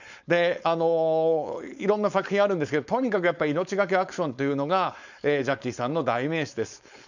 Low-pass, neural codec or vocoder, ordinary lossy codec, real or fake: 7.2 kHz; codec, 16 kHz, 4.8 kbps, FACodec; none; fake